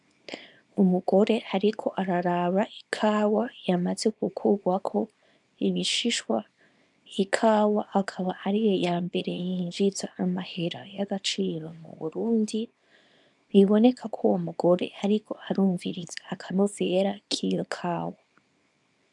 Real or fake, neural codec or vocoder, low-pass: fake; codec, 24 kHz, 0.9 kbps, WavTokenizer, small release; 10.8 kHz